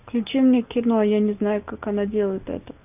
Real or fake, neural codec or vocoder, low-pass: real; none; 3.6 kHz